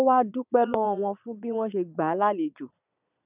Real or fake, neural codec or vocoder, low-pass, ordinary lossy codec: fake; vocoder, 22.05 kHz, 80 mel bands, Vocos; 3.6 kHz; none